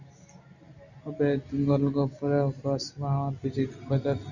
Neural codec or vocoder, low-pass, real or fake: none; 7.2 kHz; real